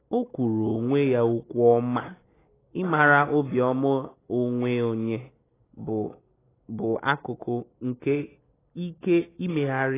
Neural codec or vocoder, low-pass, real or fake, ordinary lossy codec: none; 3.6 kHz; real; AAC, 16 kbps